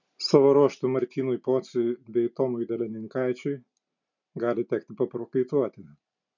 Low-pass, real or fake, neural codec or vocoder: 7.2 kHz; real; none